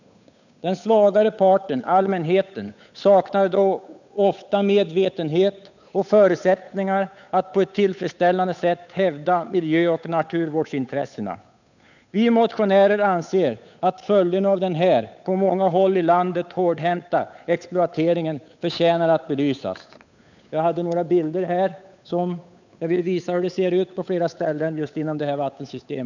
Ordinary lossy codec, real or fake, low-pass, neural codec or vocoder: none; fake; 7.2 kHz; codec, 16 kHz, 8 kbps, FunCodec, trained on Chinese and English, 25 frames a second